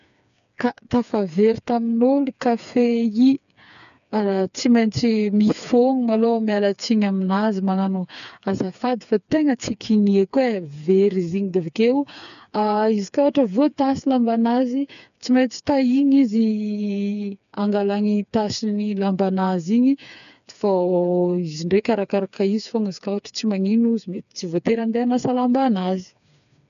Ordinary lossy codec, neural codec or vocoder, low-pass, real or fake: none; codec, 16 kHz, 4 kbps, FreqCodec, smaller model; 7.2 kHz; fake